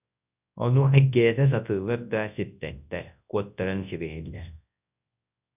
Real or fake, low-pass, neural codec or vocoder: fake; 3.6 kHz; codec, 24 kHz, 0.9 kbps, WavTokenizer, large speech release